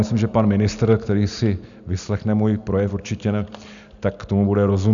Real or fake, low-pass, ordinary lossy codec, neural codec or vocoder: real; 7.2 kHz; MP3, 96 kbps; none